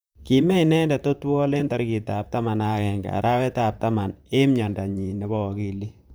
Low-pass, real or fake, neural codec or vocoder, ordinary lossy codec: none; fake; vocoder, 44.1 kHz, 128 mel bands, Pupu-Vocoder; none